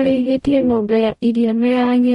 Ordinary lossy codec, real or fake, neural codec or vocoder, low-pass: MP3, 48 kbps; fake; codec, 44.1 kHz, 0.9 kbps, DAC; 19.8 kHz